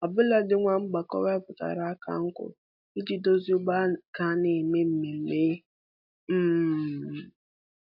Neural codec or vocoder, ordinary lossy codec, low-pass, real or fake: none; AAC, 48 kbps; 5.4 kHz; real